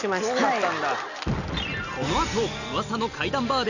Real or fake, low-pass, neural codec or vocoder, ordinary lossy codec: real; 7.2 kHz; none; none